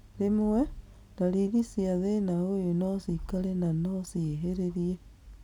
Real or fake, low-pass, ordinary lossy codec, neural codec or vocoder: real; 19.8 kHz; none; none